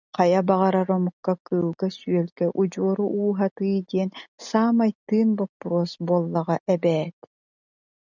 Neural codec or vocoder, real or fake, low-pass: none; real; 7.2 kHz